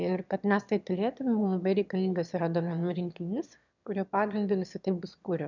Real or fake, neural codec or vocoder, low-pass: fake; autoencoder, 22.05 kHz, a latent of 192 numbers a frame, VITS, trained on one speaker; 7.2 kHz